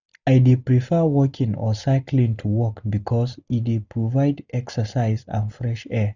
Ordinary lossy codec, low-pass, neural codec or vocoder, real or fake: none; 7.2 kHz; none; real